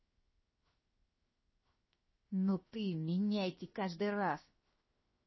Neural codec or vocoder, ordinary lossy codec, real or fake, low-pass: codec, 16 kHz, 0.7 kbps, FocalCodec; MP3, 24 kbps; fake; 7.2 kHz